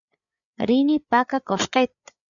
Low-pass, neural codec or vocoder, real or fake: 7.2 kHz; codec, 16 kHz, 8 kbps, FreqCodec, larger model; fake